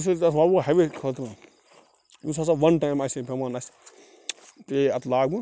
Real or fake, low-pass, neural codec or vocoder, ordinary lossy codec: real; none; none; none